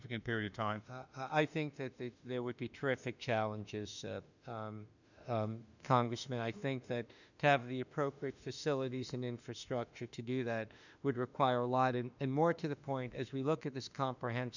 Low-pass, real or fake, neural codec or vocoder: 7.2 kHz; fake; autoencoder, 48 kHz, 32 numbers a frame, DAC-VAE, trained on Japanese speech